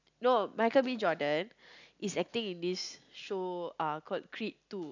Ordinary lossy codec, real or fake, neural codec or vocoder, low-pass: none; real; none; 7.2 kHz